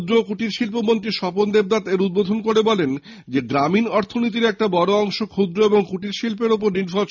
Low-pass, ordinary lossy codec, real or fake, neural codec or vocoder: 7.2 kHz; none; real; none